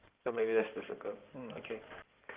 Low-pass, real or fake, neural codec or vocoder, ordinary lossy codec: 3.6 kHz; fake; codec, 16 kHz in and 24 kHz out, 2.2 kbps, FireRedTTS-2 codec; Opus, 32 kbps